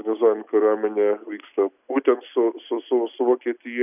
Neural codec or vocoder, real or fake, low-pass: none; real; 3.6 kHz